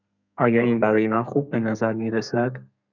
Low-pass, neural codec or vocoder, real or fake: 7.2 kHz; codec, 32 kHz, 1.9 kbps, SNAC; fake